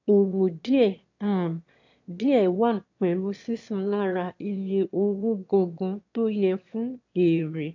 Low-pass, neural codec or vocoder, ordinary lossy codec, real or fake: 7.2 kHz; autoencoder, 22.05 kHz, a latent of 192 numbers a frame, VITS, trained on one speaker; AAC, 48 kbps; fake